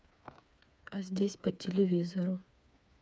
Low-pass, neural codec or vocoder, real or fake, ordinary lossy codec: none; codec, 16 kHz, 4 kbps, FreqCodec, smaller model; fake; none